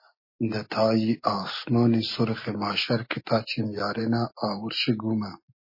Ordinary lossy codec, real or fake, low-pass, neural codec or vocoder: MP3, 24 kbps; real; 5.4 kHz; none